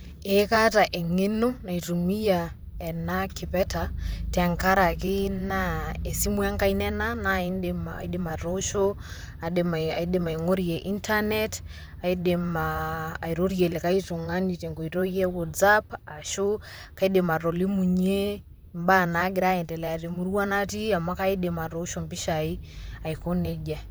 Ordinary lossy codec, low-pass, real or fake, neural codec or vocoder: none; none; fake; vocoder, 44.1 kHz, 128 mel bands, Pupu-Vocoder